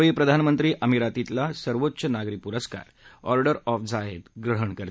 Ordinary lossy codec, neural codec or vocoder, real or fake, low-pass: none; none; real; none